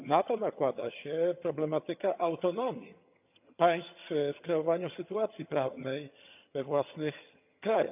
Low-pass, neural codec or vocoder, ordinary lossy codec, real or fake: 3.6 kHz; vocoder, 22.05 kHz, 80 mel bands, HiFi-GAN; none; fake